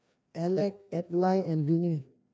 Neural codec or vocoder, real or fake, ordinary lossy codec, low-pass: codec, 16 kHz, 1 kbps, FreqCodec, larger model; fake; none; none